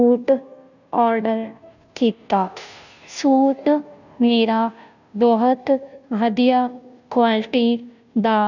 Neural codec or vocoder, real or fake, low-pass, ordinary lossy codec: codec, 16 kHz, 0.5 kbps, FunCodec, trained on Chinese and English, 25 frames a second; fake; 7.2 kHz; none